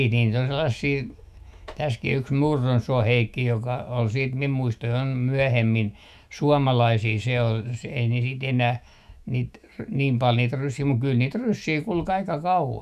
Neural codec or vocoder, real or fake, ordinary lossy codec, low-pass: autoencoder, 48 kHz, 128 numbers a frame, DAC-VAE, trained on Japanese speech; fake; none; 14.4 kHz